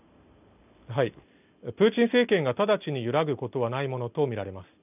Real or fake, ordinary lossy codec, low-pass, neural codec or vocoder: real; none; 3.6 kHz; none